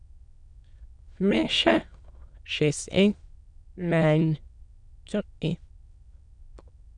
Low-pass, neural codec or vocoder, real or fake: 9.9 kHz; autoencoder, 22.05 kHz, a latent of 192 numbers a frame, VITS, trained on many speakers; fake